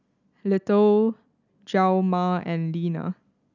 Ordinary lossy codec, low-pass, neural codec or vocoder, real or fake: none; 7.2 kHz; none; real